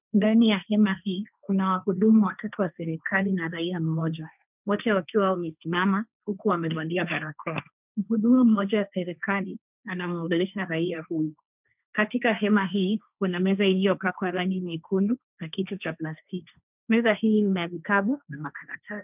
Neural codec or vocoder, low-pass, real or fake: codec, 16 kHz, 1.1 kbps, Voila-Tokenizer; 3.6 kHz; fake